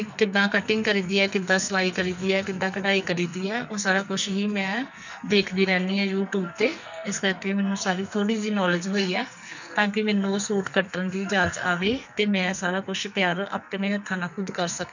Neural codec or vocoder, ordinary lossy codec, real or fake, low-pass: codec, 44.1 kHz, 2.6 kbps, SNAC; none; fake; 7.2 kHz